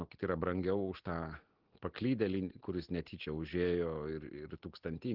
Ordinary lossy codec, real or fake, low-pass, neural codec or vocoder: Opus, 16 kbps; real; 5.4 kHz; none